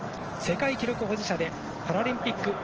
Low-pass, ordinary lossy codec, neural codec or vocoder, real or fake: 7.2 kHz; Opus, 16 kbps; none; real